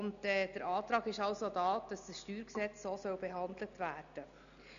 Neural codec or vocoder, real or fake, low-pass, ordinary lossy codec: none; real; 7.2 kHz; none